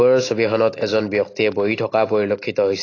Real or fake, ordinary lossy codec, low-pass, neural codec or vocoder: real; AAC, 32 kbps; 7.2 kHz; none